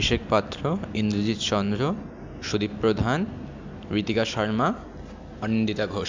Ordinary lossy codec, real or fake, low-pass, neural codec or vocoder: MP3, 64 kbps; real; 7.2 kHz; none